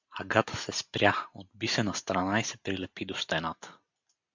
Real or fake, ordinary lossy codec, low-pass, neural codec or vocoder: real; MP3, 64 kbps; 7.2 kHz; none